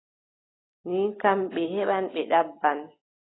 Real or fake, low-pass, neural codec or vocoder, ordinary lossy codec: real; 7.2 kHz; none; AAC, 16 kbps